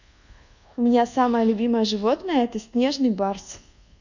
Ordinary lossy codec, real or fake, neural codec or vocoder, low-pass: none; fake; codec, 24 kHz, 1.2 kbps, DualCodec; 7.2 kHz